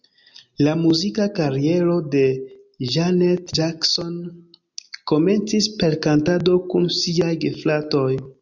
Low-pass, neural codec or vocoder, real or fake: 7.2 kHz; none; real